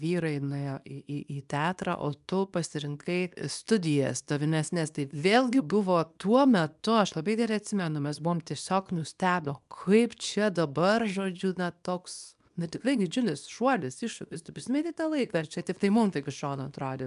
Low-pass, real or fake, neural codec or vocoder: 10.8 kHz; fake; codec, 24 kHz, 0.9 kbps, WavTokenizer, small release